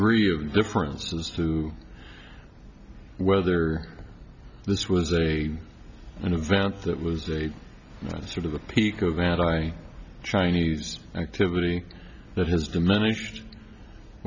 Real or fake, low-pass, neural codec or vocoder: real; 7.2 kHz; none